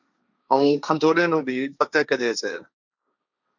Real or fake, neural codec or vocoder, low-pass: fake; codec, 16 kHz, 1.1 kbps, Voila-Tokenizer; 7.2 kHz